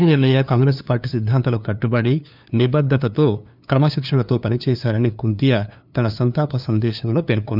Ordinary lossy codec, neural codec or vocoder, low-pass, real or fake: none; codec, 16 kHz, 2 kbps, FunCodec, trained on LibriTTS, 25 frames a second; 5.4 kHz; fake